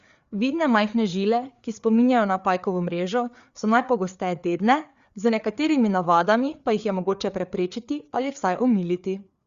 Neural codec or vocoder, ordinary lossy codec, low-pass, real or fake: codec, 16 kHz, 4 kbps, FreqCodec, larger model; Opus, 64 kbps; 7.2 kHz; fake